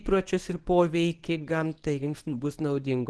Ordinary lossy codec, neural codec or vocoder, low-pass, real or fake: Opus, 16 kbps; codec, 24 kHz, 0.9 kbps, WavTokenizer, medium speech release version 1; 10.8 kHz; fake